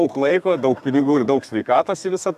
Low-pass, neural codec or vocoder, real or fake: 14.4 kHz; codec, 32 kHz, 1.9 kbps, SNAC; fake